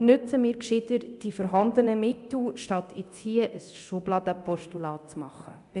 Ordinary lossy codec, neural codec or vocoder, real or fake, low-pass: none; codec, 24 kHz, 0.9 kbps, DualCodec; fake; 10.8 kHz